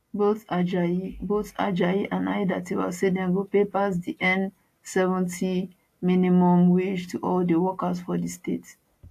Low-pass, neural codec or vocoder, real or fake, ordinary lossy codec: 14.4 kHz; none; real; AAC, 64 kbps